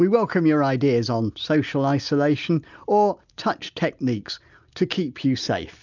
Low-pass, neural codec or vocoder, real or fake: 7.2 kHz; none; real